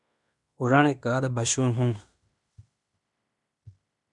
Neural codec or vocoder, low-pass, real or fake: codec, 16 kHz in and 24 kHz out, 0.9 kbps, LongCat-Audio-Codec, fine tuned four codebook decoder; 10.8 kHz; fake